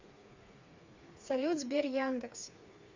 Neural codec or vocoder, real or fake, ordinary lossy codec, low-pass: codec, 16 kHz, 4 kbps, FreqCodec, smaller model; fake; MP3, 64 kbps; 7.2 kHz